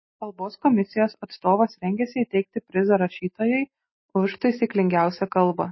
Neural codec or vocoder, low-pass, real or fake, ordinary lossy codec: none; 7.2 kHz; real; MP3, 24 kbps